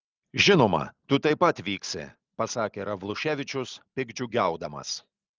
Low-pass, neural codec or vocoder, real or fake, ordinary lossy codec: 7.2 kHz; none; real; Opus, 24 kbps